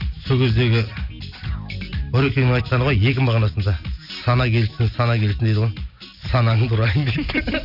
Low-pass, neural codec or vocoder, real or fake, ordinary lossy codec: 5.4 kHz; none; real; none